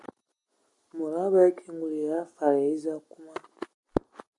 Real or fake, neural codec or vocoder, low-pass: real; none; 10.8 kHz